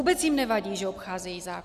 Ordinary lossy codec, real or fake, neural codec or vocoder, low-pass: Opus, 64 kbps; real; none; 14.4 kHz